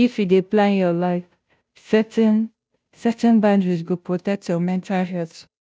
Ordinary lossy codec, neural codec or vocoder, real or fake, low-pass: none; codec, 16 kHz, 0.5 kbps, FunCodec, trained on Chinese and English, 25 frames a second; fake; none